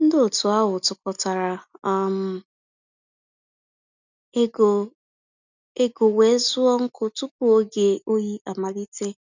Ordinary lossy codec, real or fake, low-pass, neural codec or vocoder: none; real; 7.2 kHz; none